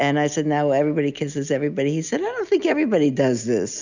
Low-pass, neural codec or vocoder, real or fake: 7.2 kHz; none; real